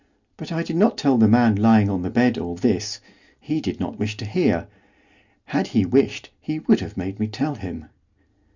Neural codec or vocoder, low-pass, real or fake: none; 7.2 kHz; real